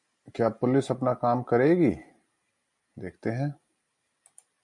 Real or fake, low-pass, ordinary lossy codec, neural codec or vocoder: real; 10.8 kHz; MP3, 64 kbps; none